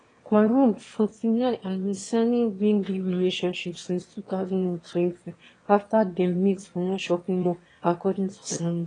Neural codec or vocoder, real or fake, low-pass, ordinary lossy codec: autoencoder, 22.05 kHz, a latent of 192 numbers a frame, VITS, trained on one speaker; fake; 9.9 kHz; AAC, 32 kbps